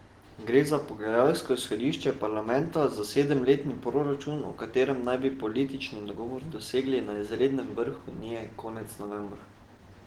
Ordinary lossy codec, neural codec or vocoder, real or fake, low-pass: Opus, 16 kbps; none; real; 19.8 kHz